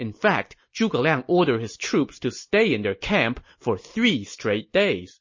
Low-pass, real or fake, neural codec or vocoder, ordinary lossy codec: 7.2 kHz; real; none; MP3, 32 kbps